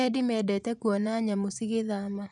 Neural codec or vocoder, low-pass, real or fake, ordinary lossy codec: vocoder, 24 kHz, 100 mel bands, Vocos; 10.8 kHz; fake; none